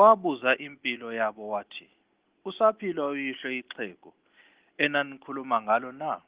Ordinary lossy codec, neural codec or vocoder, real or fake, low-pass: Opus, 16 kbps; none; real; 3.6 kHz